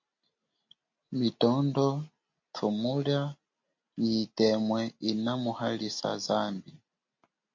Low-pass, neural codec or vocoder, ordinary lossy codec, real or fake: 7.2 kHz; none; MP3, 48 kbps; real